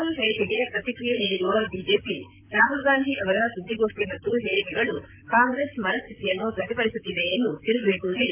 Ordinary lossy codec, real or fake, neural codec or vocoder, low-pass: AAC, 32 kbps; fake; vocoder, 44.1 kHz, 128 mel bands, Pupu-Vocoder; 3.6 kHz